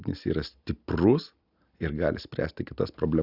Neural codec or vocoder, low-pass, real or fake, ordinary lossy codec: none; 5.4 kHz; real; Opus, 64 kbps